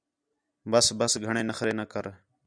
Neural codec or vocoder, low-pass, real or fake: none; 9.9 kHz; real